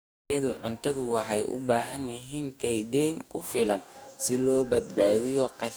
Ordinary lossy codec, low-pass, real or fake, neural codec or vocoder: none; none; fake; codec, 44.1 kHz, 2.6 kbps, DAC